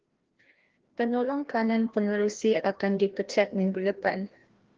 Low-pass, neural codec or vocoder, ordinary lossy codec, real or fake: 7.2 kHz; codec, 16 kHz, 1 kbps, FreqCodec, larger model; Opus, 16 kbps; fake